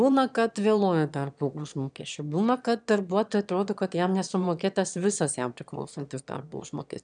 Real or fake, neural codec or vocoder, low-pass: fake; autoencoder, 22.05 kHz, a latent of 192 numbers a frame, VITS, trained on one speaker; 9.9 kHz